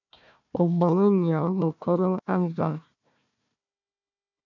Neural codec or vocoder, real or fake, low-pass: codec, 16 kHz, 1 kbps, FunCodec, trained on Chinese and English, 50 frames a second; fake; 7.2 kHz